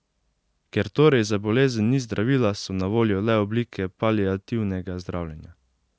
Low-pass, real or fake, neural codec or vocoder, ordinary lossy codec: none; real; none; none